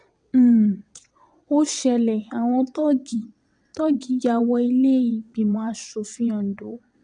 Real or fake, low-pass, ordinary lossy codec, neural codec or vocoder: fake; 9.9 kHz; none; vocoder, 22.05 kHz, 80 mel bands, WaveNeXt